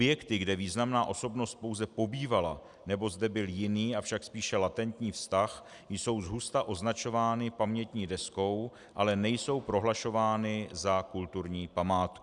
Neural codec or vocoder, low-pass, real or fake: none; 10.8 kHz; real